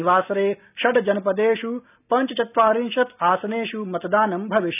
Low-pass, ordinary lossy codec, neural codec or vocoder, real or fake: 3.6 kHz; none; none; real